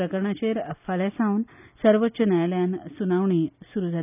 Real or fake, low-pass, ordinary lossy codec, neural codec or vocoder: real; 3.6 kHz; none; none